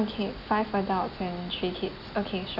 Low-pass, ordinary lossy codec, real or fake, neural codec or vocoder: 5.4 kHz; none; real; none